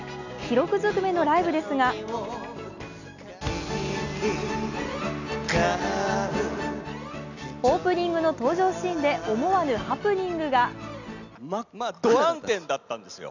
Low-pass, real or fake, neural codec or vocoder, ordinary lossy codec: 7.2 kHz; real; none; none